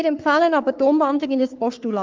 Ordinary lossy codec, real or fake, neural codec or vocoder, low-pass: Opus, 24 kbps; fake; codec, 44.1 kHz, 3.4 kbps, Pupu-Codec; 7.2 kHz